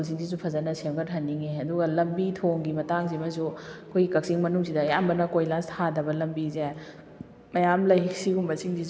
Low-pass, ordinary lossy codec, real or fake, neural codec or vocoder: none; none; real; none